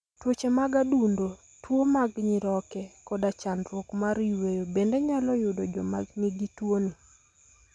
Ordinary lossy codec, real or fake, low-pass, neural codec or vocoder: none; real; none; none